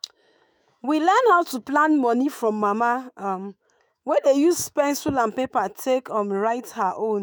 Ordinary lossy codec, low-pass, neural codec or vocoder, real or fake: none; none; autoencoder, 48 kHz, 128 numbers a frame, DAC-VAE, trained on Japanese speech; fake